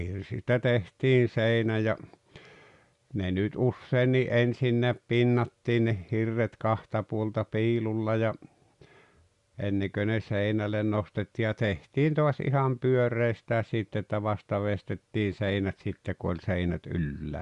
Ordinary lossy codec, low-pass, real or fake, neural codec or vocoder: Opus, 64 kbps; 10.8 kHz; real; none